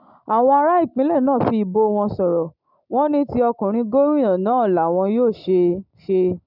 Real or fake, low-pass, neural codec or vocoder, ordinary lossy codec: real; 5.4 kHz; none; none